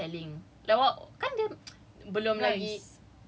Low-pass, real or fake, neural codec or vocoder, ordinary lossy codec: none; real; none; none